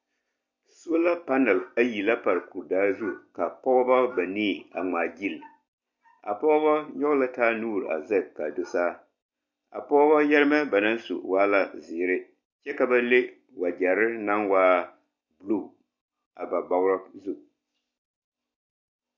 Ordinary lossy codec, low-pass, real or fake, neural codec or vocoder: MP3, 48 kbps; 7.2 kHz; real; none